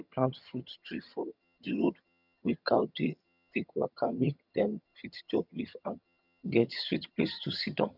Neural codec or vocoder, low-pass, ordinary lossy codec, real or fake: vocoder, 22.05 kHz, 80 mel bands, HiFi-GAN; 5.4 kHz; none; fake